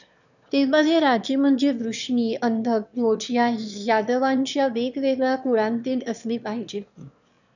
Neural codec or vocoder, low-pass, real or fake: autoencoder, 22.05 kHz, a latent of 192 numbers a frame, VITS, trained on one speaker; 7.2 kHz; fake